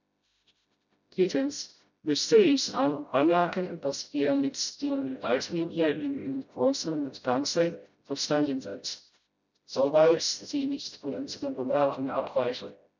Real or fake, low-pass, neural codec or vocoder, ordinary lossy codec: fake; 7.2 kHz; codec, 16 kHz, 0.5 kbps, FreqCodec, smaller model; none